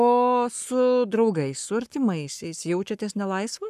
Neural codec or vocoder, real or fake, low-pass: codec, 44.1 kHz, 7.8 kbps, Pupu-Codec; fake; 14.4 kHz